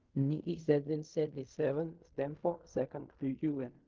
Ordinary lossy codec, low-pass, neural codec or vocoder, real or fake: Opus, 32 kbps; 7.2 kHz; codec, 16 kHz in and 24 kHz out, 0.4 kbps, LongCat-Audio-Codec, fine tuned four codebook decoder; fake